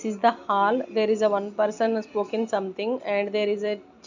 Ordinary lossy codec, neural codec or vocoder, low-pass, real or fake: none; none; 7.2 kHz; real